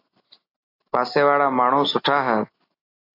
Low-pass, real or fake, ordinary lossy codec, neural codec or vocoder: 5.4 kHz; real; AAC, 32 kbps; none